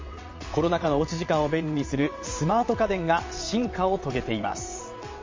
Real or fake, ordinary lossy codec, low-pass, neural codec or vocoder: fake; MP3, 32 kbps; 7.2 kHz; vocoder, 22.05 kHz, 80 mel bands, WaveNeXt